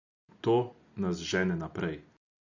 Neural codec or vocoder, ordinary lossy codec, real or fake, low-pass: none; none; real; 7.2 kHz